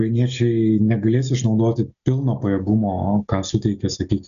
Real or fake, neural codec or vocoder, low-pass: real; none; 7.2 kHz